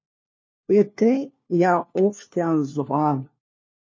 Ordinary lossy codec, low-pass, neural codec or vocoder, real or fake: MP3, 32 kbps; 7.2 kHz; codec, 16 kHz, 1 kbps, FunCodec, trained on LibriTTS, 50 frames a second; fake